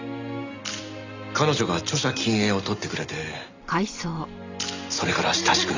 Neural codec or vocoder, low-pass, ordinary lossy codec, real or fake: none; 7.2 kHz; Opus, 64 kbps; real